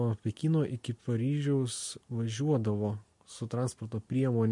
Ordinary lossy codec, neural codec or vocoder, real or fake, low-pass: MP3, 48 kbps; none; real; 10.8 kHz